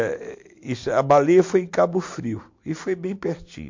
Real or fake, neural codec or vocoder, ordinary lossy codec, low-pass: real; none; MP3, 48 kbps; 7.2 kHz